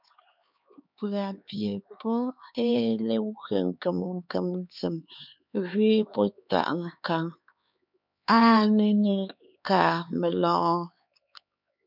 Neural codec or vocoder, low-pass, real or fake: codec, 16 kHz, 4 kbps, X-Codec, HuBERT features, trained on LibriSpeech; 5.4 kHz; fake